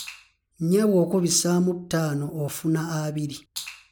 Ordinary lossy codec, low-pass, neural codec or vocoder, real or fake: none; 19.8 kHz; none; real